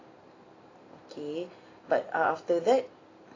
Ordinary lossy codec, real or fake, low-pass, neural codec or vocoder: AAC, 32 kbps; real; 7.2 kHz; none